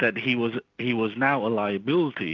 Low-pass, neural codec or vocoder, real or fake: 7.2 kHz; none; real